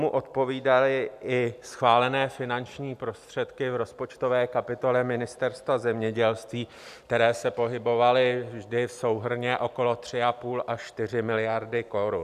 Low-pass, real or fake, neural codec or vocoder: 14.4 kHz; real; none